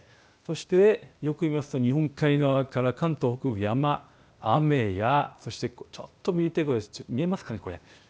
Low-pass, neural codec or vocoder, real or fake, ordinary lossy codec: none; codec, 16 kHz, 0.8 kbps, ZipCodec; fake; none